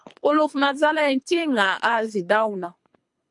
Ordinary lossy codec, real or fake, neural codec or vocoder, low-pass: MP3, 64 kbps; fake; codec, 24 kHz, 3 kbps, HILCodec; 10.8 kHz